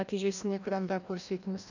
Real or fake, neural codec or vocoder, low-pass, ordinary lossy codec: fake; codec, 16 kHz, 1 kbps, FreqCodec, larger model; 7.2 kHz; none